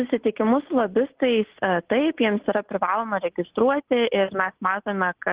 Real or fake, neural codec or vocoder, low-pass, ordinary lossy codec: real; none; 3.6 kHz; Opus, 16 kbps